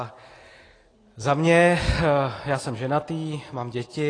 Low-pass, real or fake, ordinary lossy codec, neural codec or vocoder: 9.9 kHz; real; AAC, 32 kbps; none